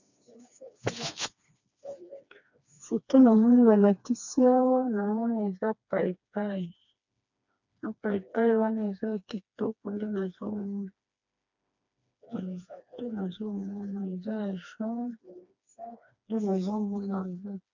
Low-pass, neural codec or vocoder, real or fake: 7.2 kHz; codec, 16 kHz, 2 kbps, FreqCodec, smaller model; fake